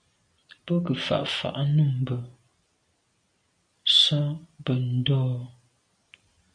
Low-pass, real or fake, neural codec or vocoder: 9.9 kHz; real; none